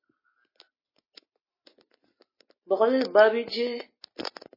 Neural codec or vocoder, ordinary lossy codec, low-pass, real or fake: none; MP3, 24 kbps; 5.4 kHz; real